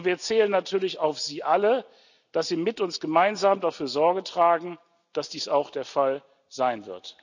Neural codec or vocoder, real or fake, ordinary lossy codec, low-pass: none; real; none; 7.2 kHz